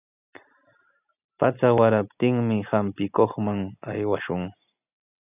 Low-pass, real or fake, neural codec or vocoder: 3.6 kHz; real; none